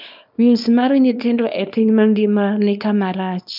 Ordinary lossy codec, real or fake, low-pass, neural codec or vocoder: none; fake; 5.4 kHz; codec, 24 kHz, 0.9 kbps, WavTokenizer, small release